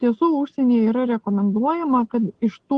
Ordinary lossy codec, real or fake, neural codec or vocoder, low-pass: Opus, 16 kbps; real; none; 9.9 kHz